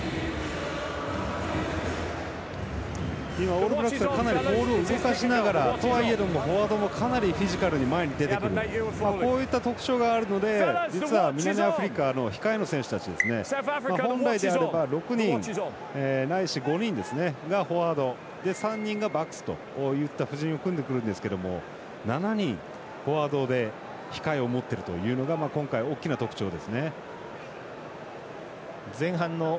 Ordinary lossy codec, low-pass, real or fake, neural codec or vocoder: none; none; real; none